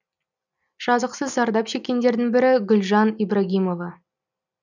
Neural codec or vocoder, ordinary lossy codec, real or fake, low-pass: none; none; real; 7.2 kHz